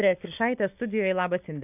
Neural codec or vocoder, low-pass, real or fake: none; 3.6 kHz; real